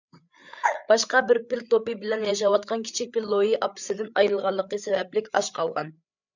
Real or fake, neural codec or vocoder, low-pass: fake; codec, 16 kHz, 8 kbps, FreqCodec, larger model; 7.2 kHz